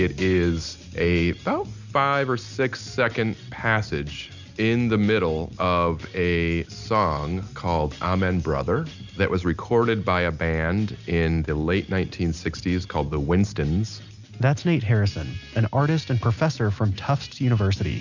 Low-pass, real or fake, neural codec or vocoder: 7.2 kHz; real; none